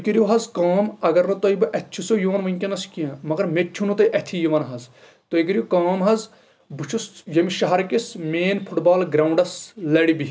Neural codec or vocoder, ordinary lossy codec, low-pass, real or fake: none; none; none; real